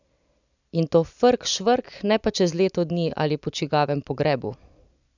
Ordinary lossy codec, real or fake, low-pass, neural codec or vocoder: none; real; 7.2 kHz; none